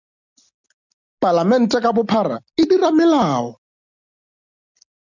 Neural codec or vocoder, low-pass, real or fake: none; 7.2 kHz; real